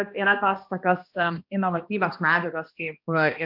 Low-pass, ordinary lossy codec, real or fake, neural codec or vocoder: 5.4 kHz; MP3, 48 kbps; fake; codec, 16 kHz, 1 kbps, X-Codec, HuBERT features, trained on balanced general audio